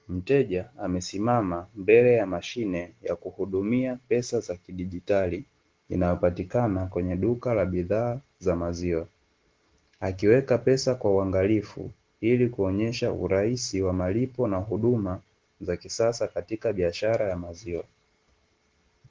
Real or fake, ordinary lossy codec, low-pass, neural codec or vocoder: real; Opus, 16 kbps; 7.2 kHz; none